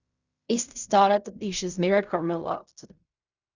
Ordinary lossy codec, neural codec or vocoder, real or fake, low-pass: Opus, 64 kbps; codec, 16 kHz in and 24 kHz out, 0.4 kbps, LongCat-Audio-Codec, fine tuned four codebook decoder; fake; 7.2 kHz